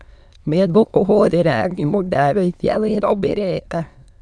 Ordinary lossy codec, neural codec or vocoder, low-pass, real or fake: none; autoencoder, 22.05 kHz, a latent of 192 numbers a frame, VITS, trained on many speakers; none; fake